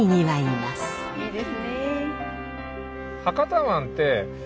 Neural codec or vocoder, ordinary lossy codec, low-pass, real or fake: none; none; none; real